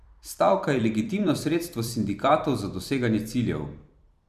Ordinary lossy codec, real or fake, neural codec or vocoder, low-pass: none; fake; vocoder, 44.1 kHz, 128 mel bands every 256 samples, BigVGAN v2; 14.4 kHz